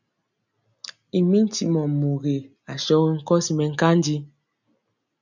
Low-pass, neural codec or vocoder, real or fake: 7.2 kHz; none; real